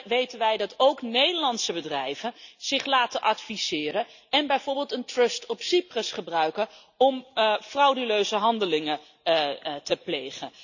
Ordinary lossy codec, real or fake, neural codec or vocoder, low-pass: none; real; none; 7.2 kHz